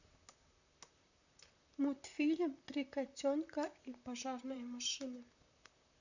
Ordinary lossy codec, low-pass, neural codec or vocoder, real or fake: none; 7.2 kHz; vocoder, 44.1 kHz, 128 mel bands, Pupu-Vocoder; fake